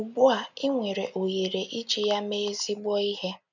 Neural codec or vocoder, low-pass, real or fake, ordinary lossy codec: none; 7.2 kHz; real; none